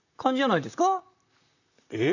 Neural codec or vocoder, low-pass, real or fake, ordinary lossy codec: vocoder, 44.1 kHz, 128 mel bands, Pupu-Vocoder; 7.2 kHz; fake; none